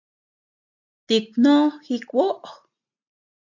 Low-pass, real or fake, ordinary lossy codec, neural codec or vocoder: 7.2 kHz; real; AAC, 48 kbps; none